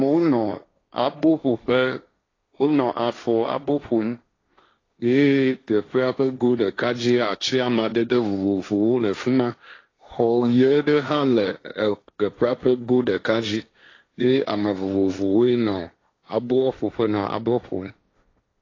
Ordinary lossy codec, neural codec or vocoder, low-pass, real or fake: AAC, 32 kbps; codec, 16 kHz, 1.1 kbps, Voila-Tokenizer; 7.2 kHz; fake